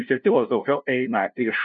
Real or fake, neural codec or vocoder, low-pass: fake; codec, 16 kHz, 0.5 kbps, FunCodec, trained on LibriTTS, 25 frames a second; 7.2 kHz